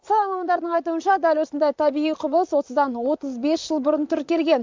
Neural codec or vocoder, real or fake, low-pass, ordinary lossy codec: vocoder, 44.1 kHz, 128 mel bands, Pupu-Vocoder; fake; 7.2 kHz; MP3, 64 kbps